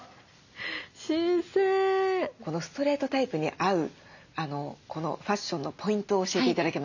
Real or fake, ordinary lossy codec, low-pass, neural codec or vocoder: real; none; 7.2 kHz; none